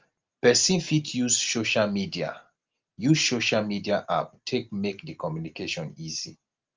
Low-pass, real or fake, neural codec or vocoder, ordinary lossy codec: 7.2 kHz; real; none; Opus, 32 kbps